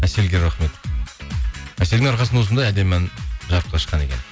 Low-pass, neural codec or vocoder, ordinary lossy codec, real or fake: none; none; none; real